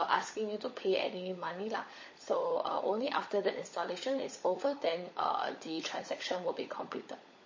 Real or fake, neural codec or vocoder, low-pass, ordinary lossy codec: fake; codec, 16 kHz, 16 kbps, FunCodec, trained on LibriTTS, 50 frames a second; 7.2 kHz; MP3, 32 kbps